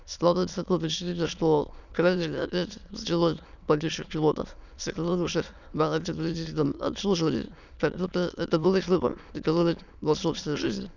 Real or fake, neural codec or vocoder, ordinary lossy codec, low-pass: fake; autoencoder, 22.05 kHz, a latent of 192 numbers a frame, VITS, trained on many speakers; none; 7.2 kHz